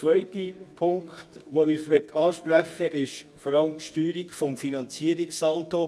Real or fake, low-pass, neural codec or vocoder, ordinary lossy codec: fake; none; codec, 24 kHz, 0.9 kbps, WavTokenizer, medium music audio release; none